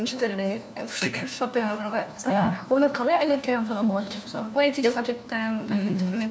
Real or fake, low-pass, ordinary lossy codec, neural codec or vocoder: fake; none; none; codec, 16 kHz, 1 kbps, FunCodec, trained on LibriTTS, 50 frames a second